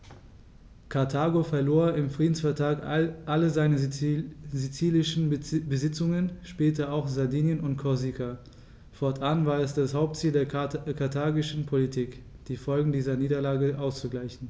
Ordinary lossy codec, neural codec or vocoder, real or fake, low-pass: none; none; real; none